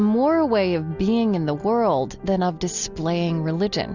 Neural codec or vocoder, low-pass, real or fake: none; 7.2 kHz; real